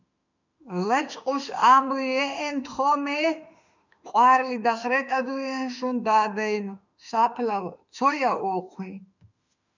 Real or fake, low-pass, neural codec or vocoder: fake; 7.2 kHz; autoencoder, 48 kHz, 32 numbers a frame, DAC-VAE, trained on Japanese speech